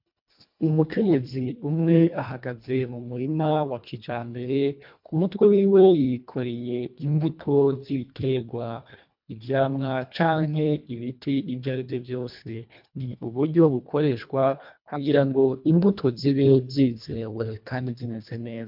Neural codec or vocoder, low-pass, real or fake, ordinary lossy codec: codec, 24 kHz, 1.5 kbps, HILCodec; 5.4 kHz; fake; MP3, 48 kbps